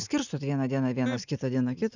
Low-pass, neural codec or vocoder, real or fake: 7.2 kHz; none; real